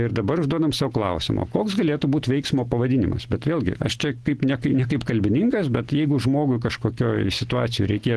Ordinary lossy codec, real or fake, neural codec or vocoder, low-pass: Opus, 16 kbps; real; none; 10.8 kHz